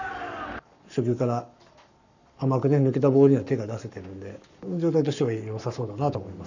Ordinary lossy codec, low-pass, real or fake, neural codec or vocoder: none; 7.2 kHz; fake; codec, 44.1 kHz, 7.8 kbps, Pupu-Codec